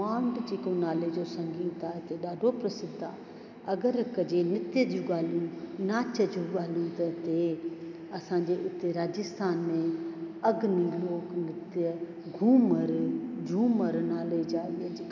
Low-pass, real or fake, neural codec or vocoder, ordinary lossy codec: 7.2 kHz; real; none; none